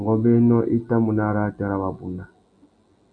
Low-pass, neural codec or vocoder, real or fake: 9.9 kHz; none; real